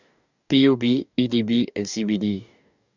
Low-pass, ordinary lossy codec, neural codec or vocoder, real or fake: 7.2 kHz; none; codec, 44.1 kHz, 2.6 kbps, DAC; fake